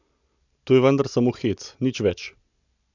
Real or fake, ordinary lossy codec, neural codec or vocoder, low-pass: real; none; none; 7.2 kHz